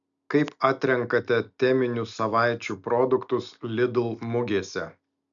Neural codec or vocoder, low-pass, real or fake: none; 7.2 kHz; real